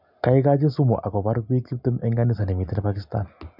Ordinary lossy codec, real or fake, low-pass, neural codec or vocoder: none; real; 5.4 kHz; none